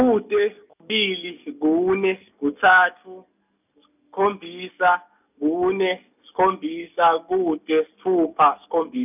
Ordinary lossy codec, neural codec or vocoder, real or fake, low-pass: none; none; real; 3.6 kHz